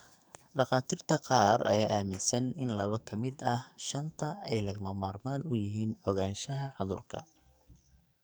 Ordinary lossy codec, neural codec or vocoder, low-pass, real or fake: none; codec, 44.1 kHz, 2.6 kbps, SNAC; none; fake